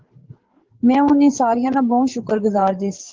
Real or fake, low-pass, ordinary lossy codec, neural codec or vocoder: fake; 7.2 kHz; Opus, 16 kbps; codec, 16 kHz, 8 kbps, FreqCodec, larger model